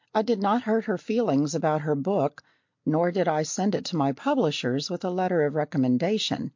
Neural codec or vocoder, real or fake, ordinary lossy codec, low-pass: none; real; MP3, 48 kbps; 7.2 kHz